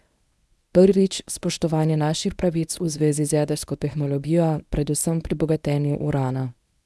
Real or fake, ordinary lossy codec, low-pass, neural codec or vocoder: fake; none; none; codec, 24 kHz, 0.9 kbps, WavTokenizer, medium speech release version 1